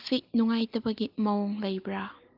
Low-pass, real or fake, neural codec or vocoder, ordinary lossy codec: 5.4 kHz; real; none; Opus, 32 kbps